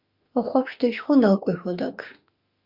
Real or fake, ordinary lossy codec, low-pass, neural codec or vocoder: fake; Opus, 64 kbps; 5.4 kHz; autoencoder, 48 kHz, 32 numbers a frame, DAC-VAE, trained on Japanese speech